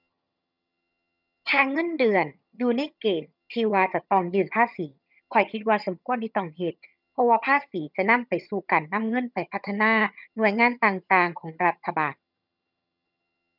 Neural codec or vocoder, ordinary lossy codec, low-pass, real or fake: vocoder, 22.05 kHz, 80 mel bands, HiFi-GAN; none; 5.4 kHz; fake